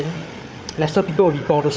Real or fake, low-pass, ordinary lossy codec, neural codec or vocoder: fake; none; none; codec, 16 kHz, 8 kbps, FreqCodec, larger model